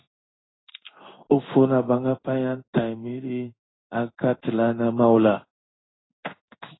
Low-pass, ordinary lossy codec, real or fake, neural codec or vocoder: 7.2 kHz; AAC, 16 kbps; fake; codec, 16 kHz in and 24 kHz out, 1 kbps, XY-Tokenizer